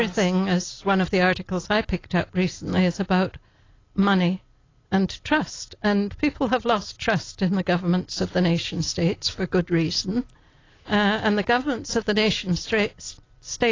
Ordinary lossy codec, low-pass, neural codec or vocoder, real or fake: AAC, 32 kbps; 7.2 kHz; none; real